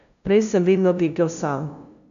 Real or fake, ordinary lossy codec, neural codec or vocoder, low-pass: fake; none; codec, 16 kHz, 0.5 kbps, FunCodec, trained on LibriTTS, 25 frames a second; 7.2 kHz